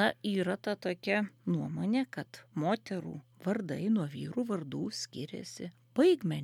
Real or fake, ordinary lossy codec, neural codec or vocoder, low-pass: real; MP3, 96 kbps; none; 19.8 kHz